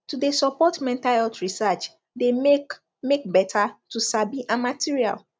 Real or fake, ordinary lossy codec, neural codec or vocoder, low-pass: real; none; none; none